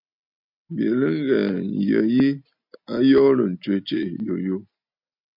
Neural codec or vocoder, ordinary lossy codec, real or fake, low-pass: none; MP3, 48 kbps; real; 5.4 kHz